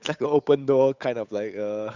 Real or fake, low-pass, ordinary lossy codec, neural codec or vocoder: fake; 7.2 kHz; none; codec, 16 kHz, 8 kbps, FunCodec, trained on Chinese and English, 25 frames a second